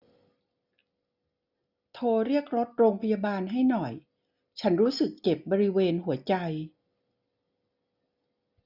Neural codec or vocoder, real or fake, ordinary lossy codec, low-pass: none; real; none; 5.4 kHz